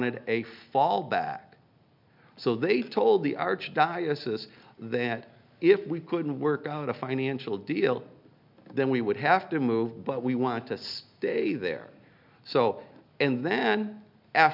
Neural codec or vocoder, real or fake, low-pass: none; real; 5.4 kHz